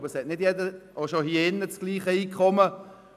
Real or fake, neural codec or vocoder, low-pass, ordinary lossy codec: real; none; 14.4 kHz; none